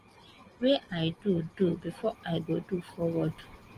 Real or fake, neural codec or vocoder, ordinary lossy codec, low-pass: real; none; Opus, 24 kbps; 14.4 kHz